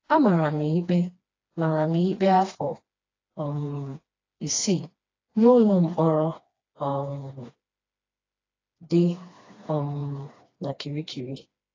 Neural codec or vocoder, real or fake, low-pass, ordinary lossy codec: codec, 16 kHz, 2 kbps, FreqCodec, smaller model; fake; 7.2 kHz; AAC, 32 kbps